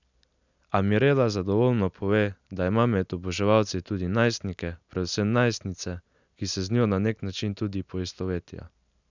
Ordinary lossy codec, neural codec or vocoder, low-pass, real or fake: none; none; 7.2 kHz; real